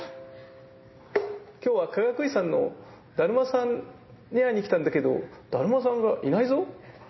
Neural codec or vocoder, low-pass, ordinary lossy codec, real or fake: none; 7.2 kHz; MP3, 24 kbps; real